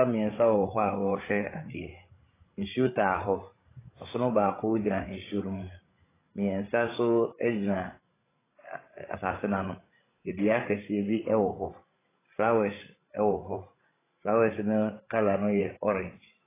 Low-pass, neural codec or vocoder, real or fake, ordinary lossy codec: 3.6 kHz; codec, 16 kHz, 16 kbps, FreqCodec, larger model; fake; AAC, 16 kbps